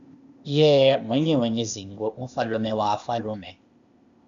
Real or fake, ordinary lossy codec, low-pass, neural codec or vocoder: fake; MP3, 96 kbps; 7.2 kHz; codec, 16 kHz, 0.8 kbps, ZipCodec